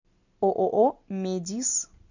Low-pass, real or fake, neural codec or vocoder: 7.2 kHz; real; none